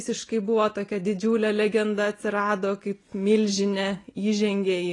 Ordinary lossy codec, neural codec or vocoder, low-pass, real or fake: AAC, 32 kbps; none; 10.8 kHz; real